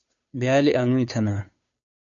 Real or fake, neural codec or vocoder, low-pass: fake; codec, 16 kHz, 2 kbps, FunCodec, trained on Chinese and English, 25 frames a second; 7.2 kHz